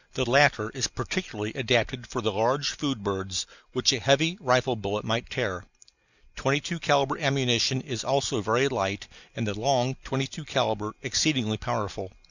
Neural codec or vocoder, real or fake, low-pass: none; real; 7.2 kHz